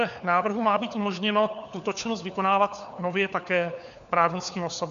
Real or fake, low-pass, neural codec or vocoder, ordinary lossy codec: fake; 7.2 kHz; codec, 16 kHz, 4 kbps, FunCodec, trained on LibriTTS, 50 frames a second; AAC, 96 kbps